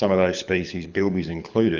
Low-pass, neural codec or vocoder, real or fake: 7.2 kHz; codec, 24 kHz, 6 kbps, HILCodec; fake